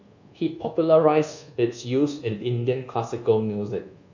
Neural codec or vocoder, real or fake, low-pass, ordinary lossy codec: codec, 24 kHz, 1.2 kbps, DualCodec; fake; 7.2 kHz; none